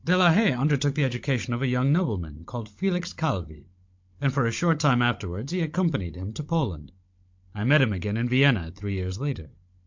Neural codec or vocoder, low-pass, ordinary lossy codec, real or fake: codec, 16 kHz, 16 kbps, FunCodec, trained on Chinese and English, 50 frames a second; 7.2 kHz; MP3, 48 kbps; fake